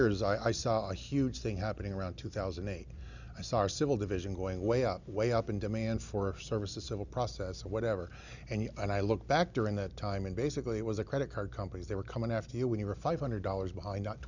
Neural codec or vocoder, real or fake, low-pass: none; real; 7.2 kHz